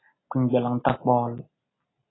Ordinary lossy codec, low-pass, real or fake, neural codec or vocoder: AAC, 16 kbps; 7.2 kHz; real; none